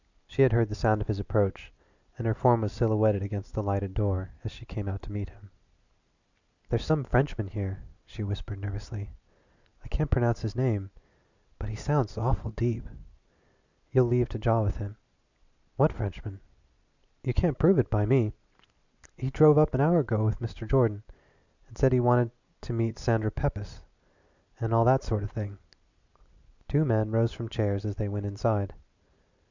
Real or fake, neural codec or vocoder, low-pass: real; none; 7.2 kHz